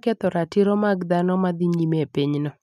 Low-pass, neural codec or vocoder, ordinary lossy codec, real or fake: 14.4 kHz; none; none; real